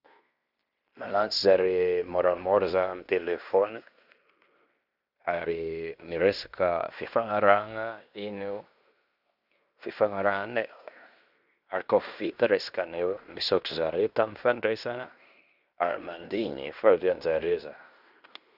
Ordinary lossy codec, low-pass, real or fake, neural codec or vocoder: none; 5.4 kHz; fake; codec, 16 kHz in and 24 kHz out, 0.9 kbps, LongCat-Audio-Codec, fine tuned four codebook decoder